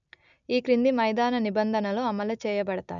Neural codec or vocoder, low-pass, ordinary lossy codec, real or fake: none; 7.2 kHz; none; real